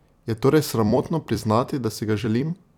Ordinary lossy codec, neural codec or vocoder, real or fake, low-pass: none; vocoder, 44.1 kHz, 128 mel bands every 256 samples, BigVGAN v2; fake; 19.8 kHz